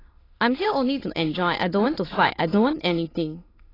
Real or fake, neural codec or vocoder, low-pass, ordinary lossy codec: fake; autoencoder, 22.05 kHz, a latent of 192 numbers a frame, VITS, trained on many speakers; 5.4 kHz; AAC, 24 kbps